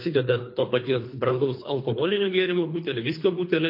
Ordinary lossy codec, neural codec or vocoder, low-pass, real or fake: MP3, 32 kbps; codec, 24 kHz, 3 kbps, HILCodec; 5.4 kHz; fake